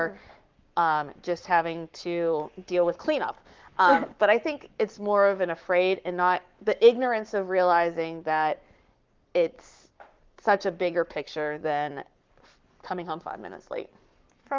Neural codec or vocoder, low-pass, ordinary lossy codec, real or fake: codec, 44.1 kHz, 7.8 kbps, Pupu-Codec; 7.2 kHz; Opus, 24 kbps; fake